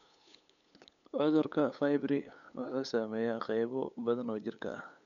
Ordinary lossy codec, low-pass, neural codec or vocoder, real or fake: none; 7.2 kHz; codec, 16 kHz, 8 kbps, FunCodec, trained on LibriTTS, 25 frames a second; fake